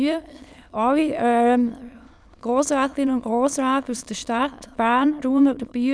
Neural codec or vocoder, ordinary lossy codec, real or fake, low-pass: autoencoder, 22.05 kHz, a latent of 192 numbers a frame, VITS, trained on many speakers; none; fake; none